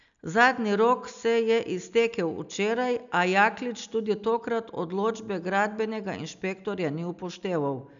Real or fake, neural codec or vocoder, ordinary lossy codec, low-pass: real; none; none; 7.2 kHz